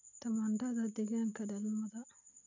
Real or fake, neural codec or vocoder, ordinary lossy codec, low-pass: real; none; none; 7.2 kHz